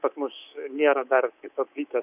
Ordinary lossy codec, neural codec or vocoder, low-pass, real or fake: AAC, 32 kbps; codec, 24 kHz, 3.1 kbps, DualCodec; 3.6 kHz; fake